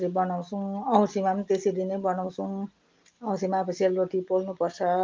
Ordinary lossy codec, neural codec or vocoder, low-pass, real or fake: Opus, 32 kbps; none; 7.2 kHz; real